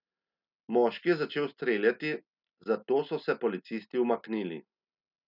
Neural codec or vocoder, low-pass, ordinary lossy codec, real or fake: none; 5.4 kHz; none; real